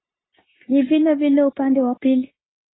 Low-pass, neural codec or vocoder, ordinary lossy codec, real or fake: 7.2 kHz; codec, 16 kHz, 0.9 kbps, LongCat-Audio-Codec; AAC, 16 kbps; fake